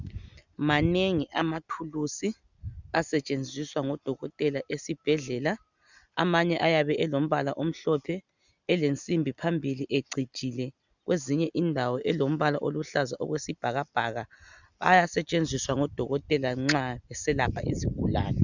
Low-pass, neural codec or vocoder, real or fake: 7.2 kHz; none; real